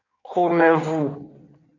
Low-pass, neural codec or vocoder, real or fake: 7.2 kHz; codec, 16 kHz in and 24 kHz out, 1.1 kbps, FireRedTTS-2 codec; fake